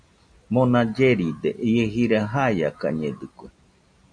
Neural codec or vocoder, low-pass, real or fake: none; 9.9 kHz; real